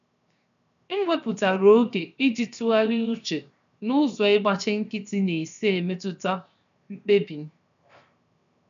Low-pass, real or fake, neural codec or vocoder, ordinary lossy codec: 7.2 kHz; fake; codec, 16 kHz, 0.7 kbps, FocalCodec; none